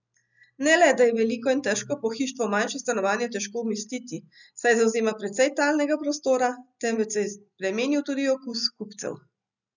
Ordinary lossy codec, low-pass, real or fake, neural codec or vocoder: none; 7.2 kHz; real; none